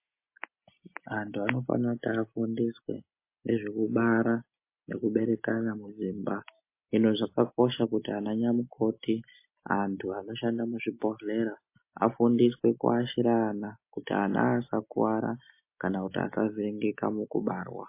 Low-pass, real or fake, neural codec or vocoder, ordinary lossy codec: 3.6 kHz; real; none; MP3, 24 kbps